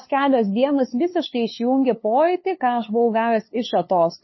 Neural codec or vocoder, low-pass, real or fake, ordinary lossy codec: codec, 16 kHz, 2 kbps, FunCodec, trained on LibriTTS, 25 frames a second; 7.2 kHz; fake; MP3, 24 kbps